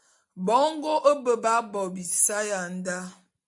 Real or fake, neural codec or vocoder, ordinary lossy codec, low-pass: real; none; AAC, 64 kbps; 10.8 kHz